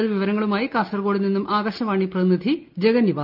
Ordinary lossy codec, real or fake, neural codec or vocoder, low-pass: Opus, 24 kbps; real; none; 5.4 kHz